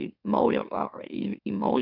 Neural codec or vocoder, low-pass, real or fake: autoencoder, 44.1 kHz, a latent of 192 numbers a frame, MeloTTS; 5.4 kHz; fake